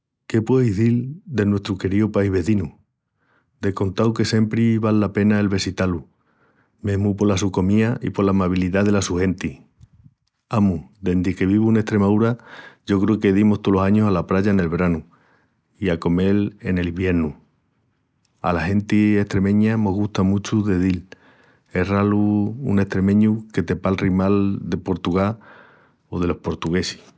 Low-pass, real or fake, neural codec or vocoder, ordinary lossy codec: none; real; none; none